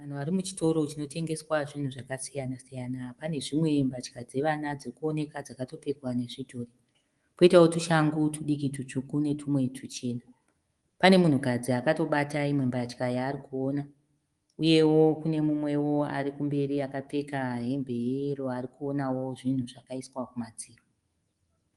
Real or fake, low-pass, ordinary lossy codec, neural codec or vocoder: fake; 10.8 kHz; Opus, 24 kbps; codec, 24 kHz, 3.1 kbps, DualCodec